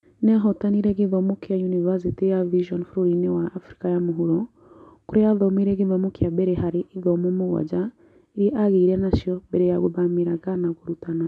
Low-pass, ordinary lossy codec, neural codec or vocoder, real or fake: none; none; none; real